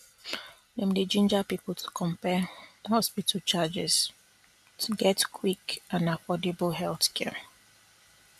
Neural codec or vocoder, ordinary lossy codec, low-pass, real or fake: none; none; 14.4 kHz; real